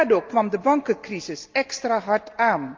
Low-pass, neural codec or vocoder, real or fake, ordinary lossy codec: 7.2 kHz; none; real; Opus, 24 kbps